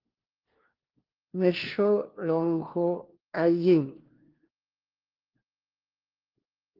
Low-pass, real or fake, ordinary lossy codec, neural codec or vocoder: 5.4 kHz; fake; Opus, 16 kbps; codec, 16 kHz, 1 kbps, FunCodec, trained on LibriTTS, 50 frames a second